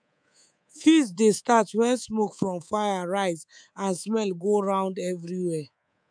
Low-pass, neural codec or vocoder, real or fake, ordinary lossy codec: 9.9 kHz; codec, 24 kHz, 3.1 kbps, DualCodec; fake; none